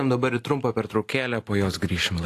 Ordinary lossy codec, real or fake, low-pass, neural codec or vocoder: AAC, 48 kbps; real; 14.4 kHz; none